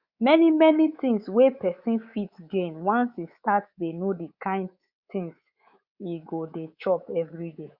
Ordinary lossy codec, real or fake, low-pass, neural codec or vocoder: Opus, 64 kbps; fake; 5.4 kHz; codec, 24 kHz, 3.1 kbps, DualCodec